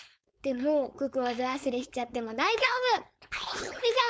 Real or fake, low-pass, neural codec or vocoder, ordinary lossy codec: fake; none; codec, 16 kHz, 4.8 kbps, FACodec; none